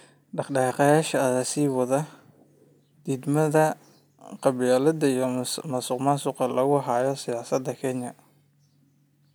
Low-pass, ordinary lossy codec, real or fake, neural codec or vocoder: none; none; real; none